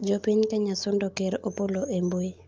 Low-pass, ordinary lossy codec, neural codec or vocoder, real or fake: 7.2 kHz; Opus, 32 kbps; none; real